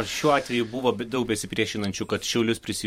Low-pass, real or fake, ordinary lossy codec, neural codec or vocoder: 19.8 kHz; fake; MP3, 64 kbps; vocoder, 44.1 kHz, 128 mel bands every 512 samples, BigVGAN v2